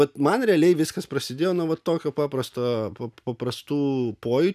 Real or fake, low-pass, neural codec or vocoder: real; 14.4 kHz; none